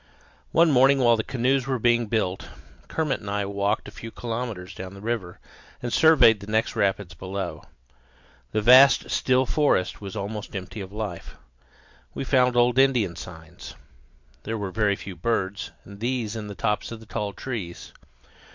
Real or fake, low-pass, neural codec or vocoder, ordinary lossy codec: real; 7.2 kHz; none; MP3, 64 kbps